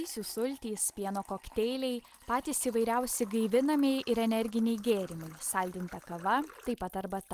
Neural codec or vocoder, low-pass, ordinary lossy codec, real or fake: none; 14.4 kHz; Opus, 24 kbps; real